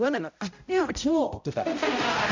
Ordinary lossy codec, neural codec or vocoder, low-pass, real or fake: MP3, 64 kbps; codec, 16 kHz, 0.5 kbps, X-Codec, HuBERT features, trained on balanced general audio; 7.2 kHz; fake